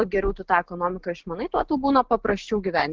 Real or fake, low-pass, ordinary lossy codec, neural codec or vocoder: real; 7.2 kHz; Opus, 16 kbps; none